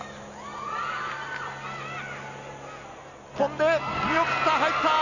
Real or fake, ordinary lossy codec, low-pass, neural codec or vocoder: real; none; 7.2 kHz; none